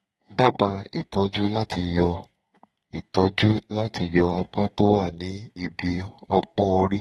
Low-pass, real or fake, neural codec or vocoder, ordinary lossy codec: 14.4 kHz; fake; codec, 32 kHz, 1.9 kbps, SNAC; AAC, 32 kbps